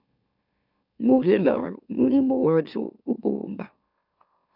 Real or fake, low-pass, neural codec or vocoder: fake; 5.4 kHz; autoencoder, 44.1 kHz, a latent of 192 numbers a frame, MeloTTS